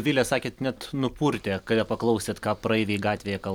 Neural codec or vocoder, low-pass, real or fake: vocoder, 44.1 kHz, 128 mel bands every 256 samples, BigVGAN v2; 19.8 kHz; fake